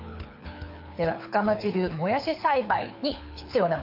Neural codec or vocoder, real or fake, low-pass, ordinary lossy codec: codec, 24 kHz, 6 kbps, HILCodec; fake; 5.4 kHz; MP3, 48 kbps